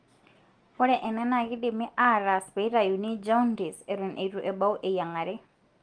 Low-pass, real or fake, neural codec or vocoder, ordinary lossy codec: 9.9 kHz; real; none; Opus, 24 kbps